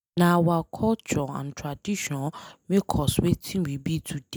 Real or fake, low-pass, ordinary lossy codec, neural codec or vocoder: real; none; none; none